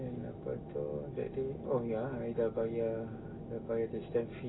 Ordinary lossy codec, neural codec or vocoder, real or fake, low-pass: AAC, 16 kbps; none; real; 7.2 kHz